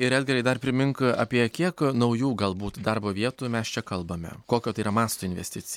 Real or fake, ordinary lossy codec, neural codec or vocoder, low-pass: real; MP3, 96 kbps; none; 19.8 kHz